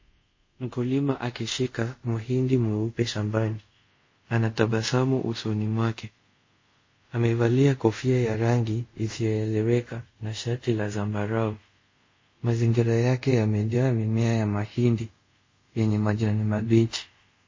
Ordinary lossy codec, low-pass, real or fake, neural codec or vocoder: MP3, 32 kbps; 7.2 kHz; fake; codec, 24 kHz, 0.5 kbps, DualCodec